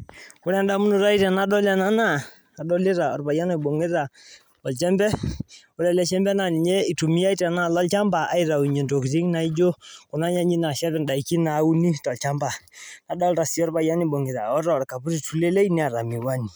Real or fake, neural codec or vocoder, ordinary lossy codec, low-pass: real; none; none; none